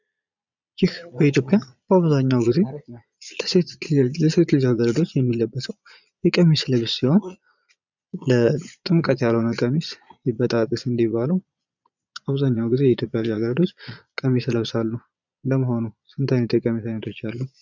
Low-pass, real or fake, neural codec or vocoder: 7.2 kHz; fake; vocoder, 22.05 kHz, 80 mel bands, Vocos